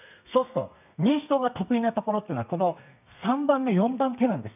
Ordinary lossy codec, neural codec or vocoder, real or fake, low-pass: none; codec, 32 kHz, 1.9 kbps, SNAC; fake; 3.6 kHz